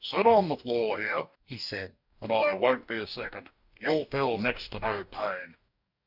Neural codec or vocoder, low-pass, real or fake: codec, 44.1 kHz, 2.6 kbps, DAC; 5.4 kHz; fake